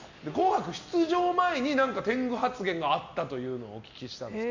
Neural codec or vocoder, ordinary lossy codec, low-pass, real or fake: none; MP3, 64 kbps; 7.2 kHz; real